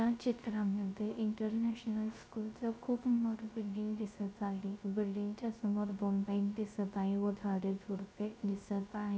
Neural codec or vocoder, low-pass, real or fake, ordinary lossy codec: codec, 16 kHz, 0.3 kbps, FocalCodec; none; fake; none